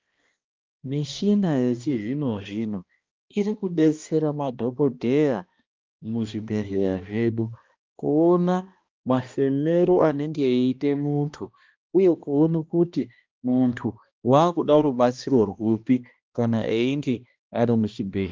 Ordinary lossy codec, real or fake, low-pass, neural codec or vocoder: Opus, 24 kbps; fake; 7.2 kHz; codec, 16 kHz, 1 kbps, X-Codec, HuBERT features, trained on balanced general audio